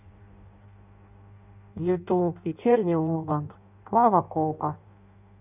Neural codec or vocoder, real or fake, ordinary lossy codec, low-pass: codec, 16 kHz in and 24 kHz out, 0.6 kbps, FireRedTTS-2 codec; fake; none; 3.6 kHz